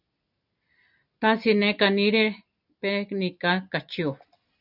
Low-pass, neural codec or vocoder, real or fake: 5.4 kHz; none; real